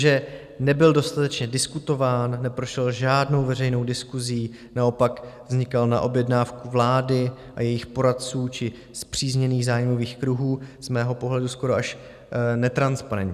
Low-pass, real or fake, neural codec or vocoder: 14.4 kHz; real; none